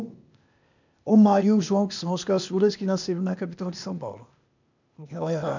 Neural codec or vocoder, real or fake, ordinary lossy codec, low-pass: codec, 16 kHz, 0.8 kbps, ZipCodec; fake; none; 7.2 kHz